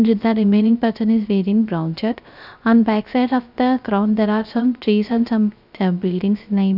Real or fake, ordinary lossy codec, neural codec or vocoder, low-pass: fake; none; codec, 16 kHz, 0.3 kbps, FocalCodec; 5.4 kHz